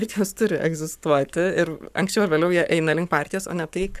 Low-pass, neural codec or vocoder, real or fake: 14.4 kHz; codec, 44.1 kHz, 7.8 kbps, Pupu-Codec; fake